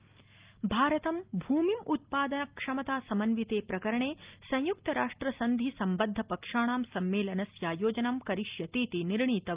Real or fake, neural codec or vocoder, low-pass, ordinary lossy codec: real; none; 3.6 kHz; Opus, 32 kbps